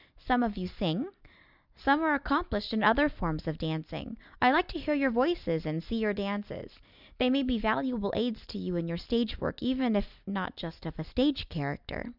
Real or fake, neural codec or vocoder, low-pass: real; none; 5.4 kHz